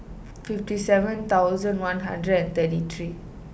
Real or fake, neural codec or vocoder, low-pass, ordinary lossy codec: real; none; none; none